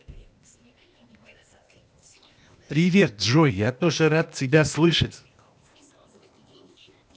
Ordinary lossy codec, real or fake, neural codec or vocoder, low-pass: none; fake; codec, 16 kHz, 0.8 kbps, ZipCodec; none